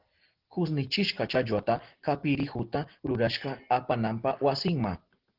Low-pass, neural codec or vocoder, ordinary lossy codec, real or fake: 5.4 kHz; none; Opus, 16 kbps; real